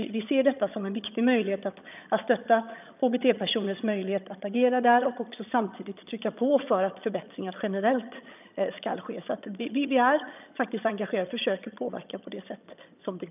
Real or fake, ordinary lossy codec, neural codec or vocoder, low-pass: fake; none; vocoder, 22.05 kHz, 80 mel bands, HiFi-GAN; 3.6 kHz